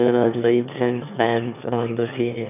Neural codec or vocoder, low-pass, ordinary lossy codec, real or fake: autoencoder, 22.05 kHz, a latent of 192 numbers a frame, VITS, trained on one speaker; 3.6 kHz; none; fake